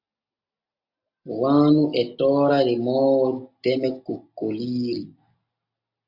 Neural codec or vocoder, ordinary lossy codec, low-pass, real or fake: none; MP3, 48 kbps; 5.4 kHz; real